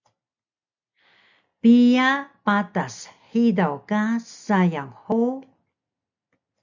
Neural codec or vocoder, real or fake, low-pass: none; real; 7.2 kHz